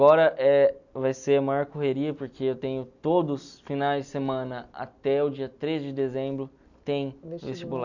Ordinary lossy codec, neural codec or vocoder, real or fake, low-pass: none; none; real; 7.2 kHz